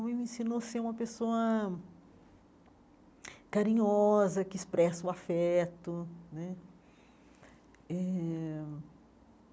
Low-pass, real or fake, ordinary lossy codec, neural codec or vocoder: none; real; none; none